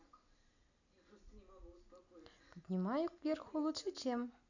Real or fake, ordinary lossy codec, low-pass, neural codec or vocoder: real; none; 7.2 kHz; none